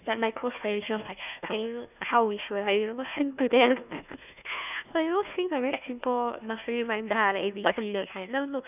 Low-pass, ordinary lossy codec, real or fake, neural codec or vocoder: 3.6 kHz; none; fake; codec, 16 kHz, 1 kbps, FunCodec, trained on Chinese and English, 50 frames a second